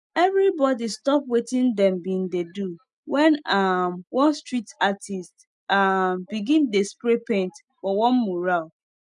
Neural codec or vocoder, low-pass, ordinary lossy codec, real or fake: none; 10.8 kHz; none; real